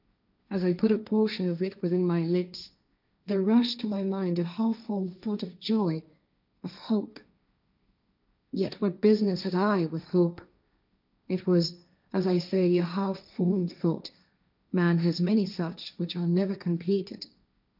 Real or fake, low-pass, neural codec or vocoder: fake; 5.4 kHz; codec, 16 kHz, 1.1 kbps, Voila-Tokenizer